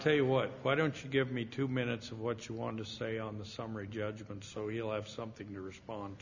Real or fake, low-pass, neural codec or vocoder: real; 7.2 kHz; none